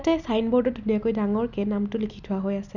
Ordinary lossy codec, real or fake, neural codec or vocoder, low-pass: none; real; none; 7.2 kHz